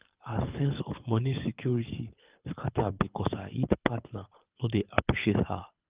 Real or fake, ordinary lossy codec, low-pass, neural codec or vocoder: fake; Opus, 24 kbps; 3.6 kHz; codec, 24 kHz, 6 kbps, HILCodec